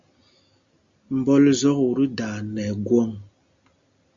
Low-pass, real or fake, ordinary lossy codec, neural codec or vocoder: 7.2 kHz; real; Opus, 64 kbps; none